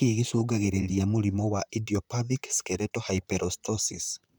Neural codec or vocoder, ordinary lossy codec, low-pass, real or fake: vocoder, 44.1 kHz, 128 mel bands, Pupu-Vocoder; none; none; fake